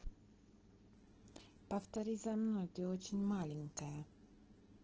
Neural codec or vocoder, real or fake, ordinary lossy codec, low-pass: none; real; Opus, 16 kbps; 7.2 kHz